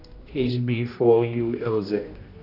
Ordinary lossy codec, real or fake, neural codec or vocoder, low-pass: none; fake; codec, 16 kHz, 1 kbps, X-Codec, HuBERT features, trained on general audio; 5.4 kHz